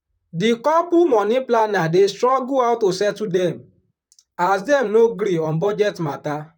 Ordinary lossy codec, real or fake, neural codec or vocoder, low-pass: none; fake; vocoder, 44.1 kHz, 128 mel bands, Pupu-Vocoder; 19.8 kHz